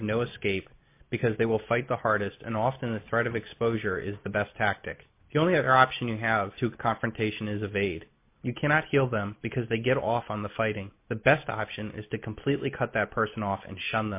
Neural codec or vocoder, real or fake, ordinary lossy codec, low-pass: none; real; MP3, 24 kbps; 3.6 kHz